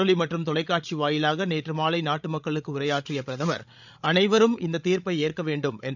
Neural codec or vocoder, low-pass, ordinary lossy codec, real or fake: codec, 16 kHz, 16 kbps, FreqCodec, larger model; 7.2 kHz; none; fake